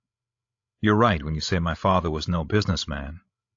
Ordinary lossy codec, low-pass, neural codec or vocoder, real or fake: AAC, 48 kbps; 7.2 kHz; codec, 16 kHz, 16 kbps, FreqCodec, larger model; fake